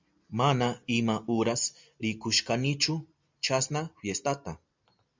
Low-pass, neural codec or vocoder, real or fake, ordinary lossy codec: 7.2 kHz; none; real; MP3, 64 kbps